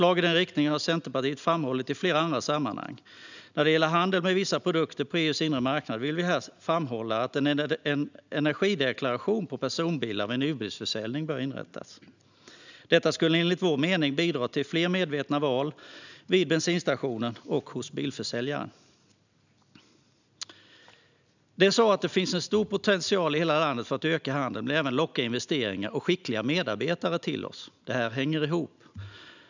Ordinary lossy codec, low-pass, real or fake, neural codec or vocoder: none; 7.2 kHz; real; none